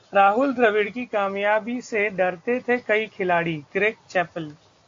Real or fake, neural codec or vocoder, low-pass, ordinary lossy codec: real; none; 7.2 kHz; AAC, 48 kbps